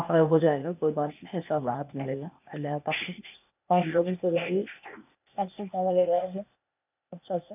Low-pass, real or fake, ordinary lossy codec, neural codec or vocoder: 3.6 kHz; fake; none; codec, 16 kHz, 0.8 kbps, ZipCodec